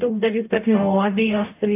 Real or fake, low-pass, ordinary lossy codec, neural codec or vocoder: fake; 3.6 kHz; AAC, 24 kbps; codec, 44.1 kHz, 0.9 kbps, DAC